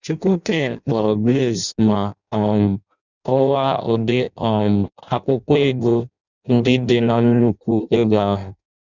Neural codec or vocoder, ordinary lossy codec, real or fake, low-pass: codec, 16 kHz in and 24 kHz out, 0.6 kbps, FireRedTTS-2 codec; none; fake; 7.2 kHz